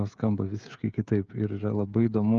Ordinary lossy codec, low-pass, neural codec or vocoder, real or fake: Opus, 32 kbps; 7.2 kHz; codec, 16 kHz, 16 kbps, FreqCodec, smaller model; fake